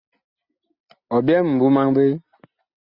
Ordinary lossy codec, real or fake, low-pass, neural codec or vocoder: Opus, 64 kbps; real; 5.4 kHz; none